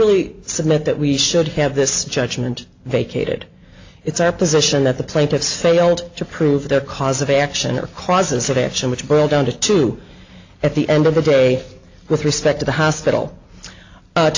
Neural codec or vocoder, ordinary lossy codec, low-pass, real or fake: none; AAC, 48 kbps; 7.2 kHz; real